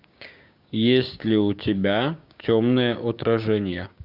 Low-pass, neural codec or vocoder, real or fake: 5.4 kHz; codec, 16 kHz, 6 kbps, DAC; fake